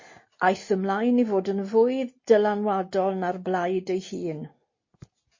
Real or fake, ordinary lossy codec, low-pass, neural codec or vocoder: real; MP3, 32 kbps; 7.2 kHz; none